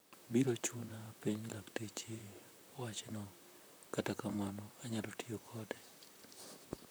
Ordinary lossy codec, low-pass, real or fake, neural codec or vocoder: none; none; fake; vocoder, 44.1 kHz, 128 mel bands, Pupu-Vocoder